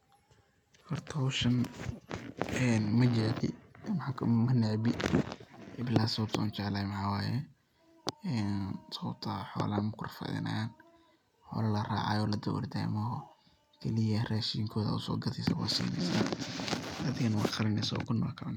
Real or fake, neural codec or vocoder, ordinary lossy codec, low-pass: real; none; none; 19.8 kHz